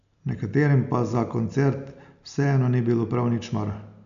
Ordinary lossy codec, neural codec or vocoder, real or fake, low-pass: none; none; real; 7.2 kHz